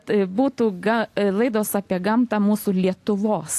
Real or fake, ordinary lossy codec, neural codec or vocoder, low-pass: real; AAC, 64 kbps; none; 14.4 kHz